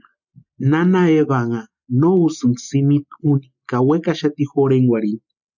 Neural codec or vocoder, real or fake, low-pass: none; real; 7.2 kHz